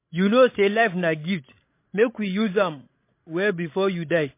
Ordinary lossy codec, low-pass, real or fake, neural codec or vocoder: MP3, 24 kbps; 3.6 kHz; fake; codec, 16 kHz, 16 kbps, FreqCodec, larger model